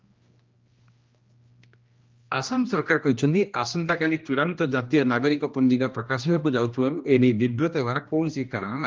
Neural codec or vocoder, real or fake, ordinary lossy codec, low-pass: codec, 16 kHz, 1 kbps, X-Codec, HuBERT features, trained on general audio; fake; Opus, 32 kbps; 7.2 kHz